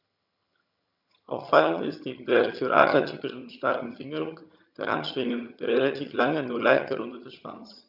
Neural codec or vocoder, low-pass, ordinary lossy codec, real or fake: vocoder, 22.05 kHz, 80 mel bands, HiFi-GAN; 5.4 kHz; none; fake